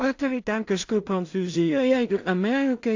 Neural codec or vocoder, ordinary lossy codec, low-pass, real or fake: codec, 16 kHz in and 24 kHz out, 0.4 kbps, LongCat-Audio-Codec, two codebook decoder; AAC, 48 kbps; 7.2 kHz; fake